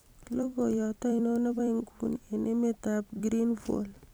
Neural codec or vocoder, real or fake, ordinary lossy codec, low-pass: vocoder, 44.1 kHz, 128 mel bands every 256 samples, BigVGAN v2; fake; none; none